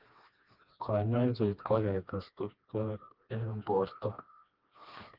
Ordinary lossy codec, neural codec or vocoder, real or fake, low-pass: Opus, 32 kbps; codec, 16 kHz, 2 kbps, FreqCodec, smaller model; fake; 5.4 kHz